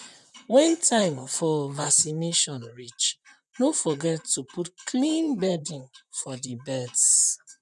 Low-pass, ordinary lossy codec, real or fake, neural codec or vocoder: 10.8 kHz; MP3, 96 kbps; fake; vocoder, 44.1 kHz, 128 mel bands, Pupu-Vocoder